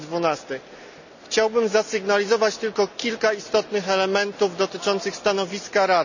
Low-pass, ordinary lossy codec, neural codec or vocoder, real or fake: 7.2 kHz; none; none; real